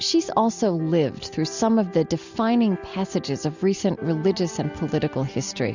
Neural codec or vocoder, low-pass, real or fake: none; 7.2 kHz; real